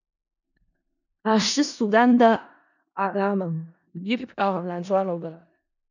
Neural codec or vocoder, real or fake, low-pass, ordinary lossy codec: codec, 16 kHz in and 24 kHz out, 0.4 kbps, LongCat-Audio-Codec, four codebook decoder; fake; 7.2 kHz; none